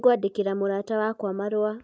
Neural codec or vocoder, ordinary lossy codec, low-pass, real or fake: none; none; none; real